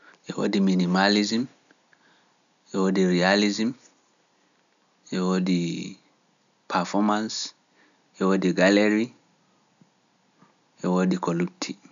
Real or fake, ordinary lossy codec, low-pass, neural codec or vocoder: real; none; 7.2 kHz; none